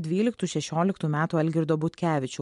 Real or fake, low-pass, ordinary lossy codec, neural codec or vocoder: real; 10.8 kHz; MP3, 64 kbps; none